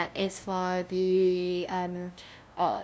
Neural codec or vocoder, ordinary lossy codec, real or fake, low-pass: codec, 16 kHz, 0.5 kbps, FunCodec, trained on LibriTTS, 25 frames a second; none; fake; none